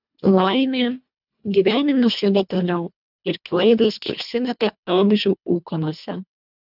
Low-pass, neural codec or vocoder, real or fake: 5.4 kHz; codec, 24 kHz, 1.5 kbps, HILCodec; fake